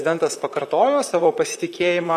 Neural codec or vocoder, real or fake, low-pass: vocoder, 44.1 kHz, 128 mel bands, Pupu-Vocoder; fake; 14.4 kHz